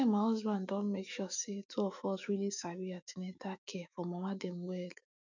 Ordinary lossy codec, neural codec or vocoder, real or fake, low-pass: none; autoencoder, 48 kHz, 128 numbers a frame, DAC-VAE, trained on Japanese speech; fake; 7.2 kHz